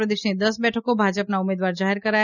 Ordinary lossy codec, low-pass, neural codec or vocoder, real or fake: none; 7.2 kHz; none; real